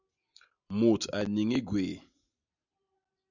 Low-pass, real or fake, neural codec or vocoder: 7.2 kHz; real; none